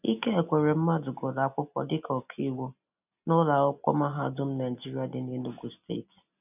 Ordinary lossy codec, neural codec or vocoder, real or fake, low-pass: none; none; real; 3.6 kHz